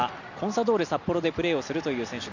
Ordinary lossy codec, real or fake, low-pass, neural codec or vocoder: none; real; 7.2 kHz; none